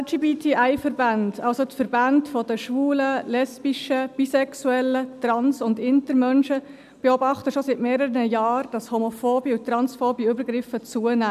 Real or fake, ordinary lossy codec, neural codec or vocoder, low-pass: real; none; none; 14.4 kHz